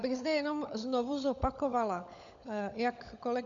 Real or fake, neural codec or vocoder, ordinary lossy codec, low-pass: fake; codec, 16 kHz, 16 kbps, FunCodec, trained on LibriTTS, 50 frames a second; MP3, 64 kbps; 7.2 kHz